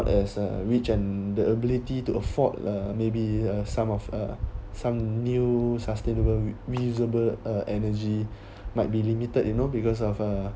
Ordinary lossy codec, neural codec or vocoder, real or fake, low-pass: none; none; real; none